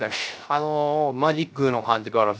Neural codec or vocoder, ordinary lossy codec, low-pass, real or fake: codec, 16 kHz, 0.3 kbps, FocalCodec; none; none; fake